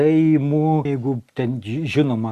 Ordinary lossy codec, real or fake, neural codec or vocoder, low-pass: Opus, 64 kbps; real; none; 14.4 kHz